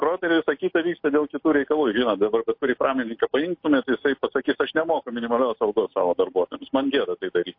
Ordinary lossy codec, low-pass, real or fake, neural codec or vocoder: MP3, 32 kbps; 5.4 kHz; real; none